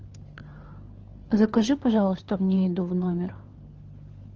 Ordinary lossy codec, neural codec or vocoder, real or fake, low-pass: Opus, 16 kbps; codec, 16 kHz, 4 kbps, FunCodec, trained on LibriTTS, 50 frames a second; fake; 7.2 kHz